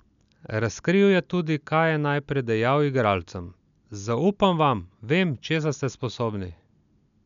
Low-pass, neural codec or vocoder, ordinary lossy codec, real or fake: 7.2 kHz; none; none; real